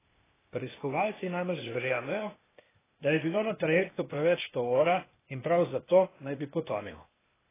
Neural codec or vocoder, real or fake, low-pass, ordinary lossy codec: codec, 16 kHz, 0.8 kbps, ZipCodec; fake; 3.6 kHz; AAC, 16 kbps